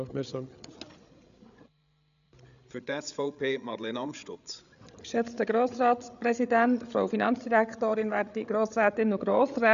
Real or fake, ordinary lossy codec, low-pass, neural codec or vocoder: fake; none; 7.2 kHz; codec, 16 kHz, 8 kbps, FreqCodec, larger model